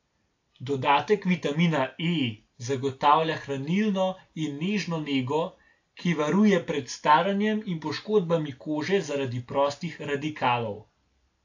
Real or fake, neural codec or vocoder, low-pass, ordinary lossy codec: real; none; 7.2 kHz; AAC, 48 kbps